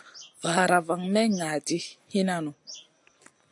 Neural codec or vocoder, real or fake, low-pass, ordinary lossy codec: none; real; 10.8 kHz; AAC, 64 kbps